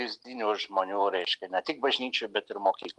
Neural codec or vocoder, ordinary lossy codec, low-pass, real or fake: none; Opus, 32 kbps; 10.8 kHz; real